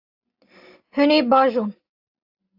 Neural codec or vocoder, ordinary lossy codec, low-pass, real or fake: none; AAC, 32 kbps; 5.4 kHz; real